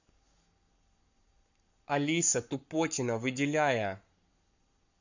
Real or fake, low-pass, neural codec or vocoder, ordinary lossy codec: fake; 7.2 kHz; codec, 44.1 kHz, 7.8 kbps, Pupu-Codec; none